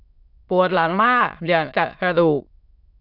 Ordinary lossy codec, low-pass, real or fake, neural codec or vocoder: none; 5.4 kHz; fake; autoencoder, 22.05 kHz, a latent of 192 numbers a frame, VITS, trained on many speakers